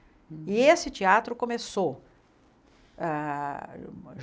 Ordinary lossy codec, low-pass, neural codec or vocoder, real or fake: none; none; none; real